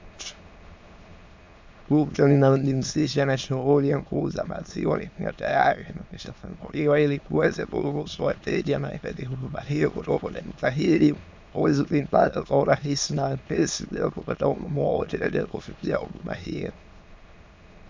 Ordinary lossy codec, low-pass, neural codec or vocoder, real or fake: MP3, 64 kbps; 7.2 kHz; autoencoder, 22.05 kHz, a latent of 192 numbers a frame, VITS, trained on many speakers; fake